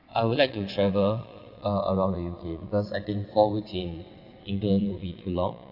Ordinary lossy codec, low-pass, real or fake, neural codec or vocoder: none; 5.4 kHz; fake; vocoder, 22.05 kHz, 80 mel bands, WaveNeXt